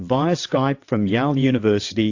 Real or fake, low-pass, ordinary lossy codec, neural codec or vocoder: fake; 7.2 kHz; AAC, 48 kbps; vocoder, 22.05 kHz, 80 mel bands, WaveNeXt